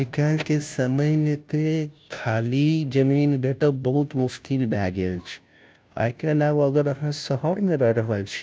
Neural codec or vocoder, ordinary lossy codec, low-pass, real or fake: codec, 16 kHz, 0.5 kbps, FunCodec, trained on Chinese and English, 25 frames a second; none; none; fake